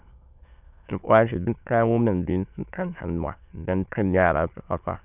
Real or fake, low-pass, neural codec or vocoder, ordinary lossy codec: fake; 3.6 kHz; autoencoder, 22.05 kHz, a latent of 192 numbers a frame, VITS, trained on many speakers; none